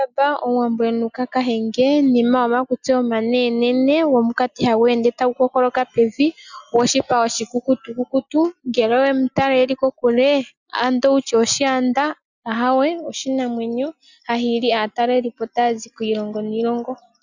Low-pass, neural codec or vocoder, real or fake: 7.2 kHz; none; real